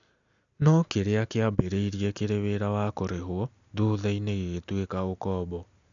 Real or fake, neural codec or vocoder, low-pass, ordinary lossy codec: real; none; 7.2 kHz; none